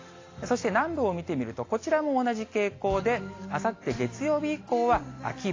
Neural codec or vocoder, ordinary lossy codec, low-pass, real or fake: none; AAC, 32 kbps; 7.2 kHz; real